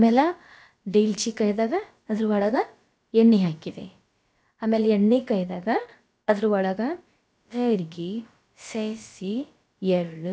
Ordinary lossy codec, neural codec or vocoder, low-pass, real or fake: none; codec, 16 kHz, about 1 kbps, DyCAST, with the encoder's durations; none; fake